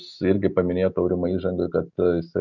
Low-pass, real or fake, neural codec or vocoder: 7.2 kHz; real; none